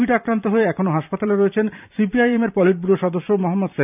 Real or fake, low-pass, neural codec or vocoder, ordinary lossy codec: fake; 3.6 kHz; vocoder, 44.1 kHz, 128 mel bands every 512 samples, BigVGAN v2; none